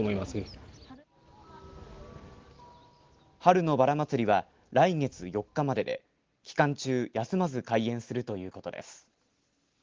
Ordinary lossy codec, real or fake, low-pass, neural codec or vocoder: Opus, 16 kbps; real; 7.2 kHz; none